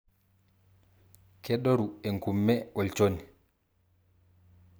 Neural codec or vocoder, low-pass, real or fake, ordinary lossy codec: none; none; real; none